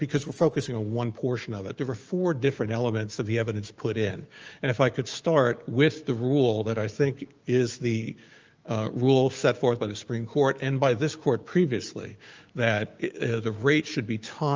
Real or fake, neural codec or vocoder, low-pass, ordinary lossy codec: fake; codec, 16 kHz, 6 kbps, DAC; 7.2 kHz; Opus, 24 kbps